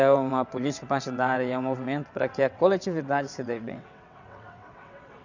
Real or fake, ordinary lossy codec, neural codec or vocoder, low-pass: fake; none; vocoder, 22.05 kHz, 80 mel bands, WaveNeXt; 7.2 kHz